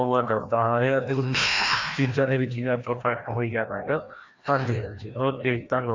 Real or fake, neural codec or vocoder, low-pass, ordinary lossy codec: fake; codec, 16 kHz, 1 kbps, FreqCodec, larger model; 7.2 kHz; AAC, 48 kbps